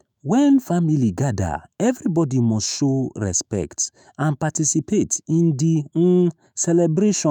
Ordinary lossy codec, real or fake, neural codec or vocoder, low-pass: none; fake; autoencoder, 48 kHz, 128 numbers a frame, DAC-VAE, trained on Japanese speech; none